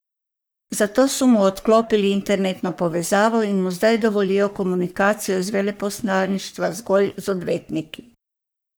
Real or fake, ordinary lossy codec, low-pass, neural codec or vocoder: fake; none; none; codec, 44.1 kHz, 3.4 kbps, Pupu-Codec